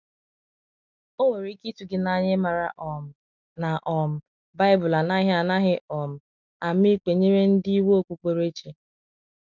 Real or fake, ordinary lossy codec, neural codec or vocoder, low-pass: real; none; none; 7.2 kHz